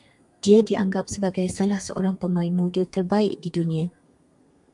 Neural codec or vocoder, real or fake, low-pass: codec, 32 kHz, 1.9 kbps, SNAC; fake; 10.8 kHz